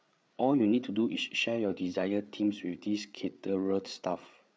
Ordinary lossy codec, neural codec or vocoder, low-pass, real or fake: none; codec, 16 kHz, 8 kbps, FreqCodec, larger model; none; fake